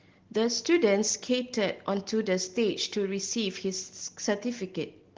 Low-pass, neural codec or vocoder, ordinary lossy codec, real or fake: 7.2 kHz; none; Opus, 16 kbps; real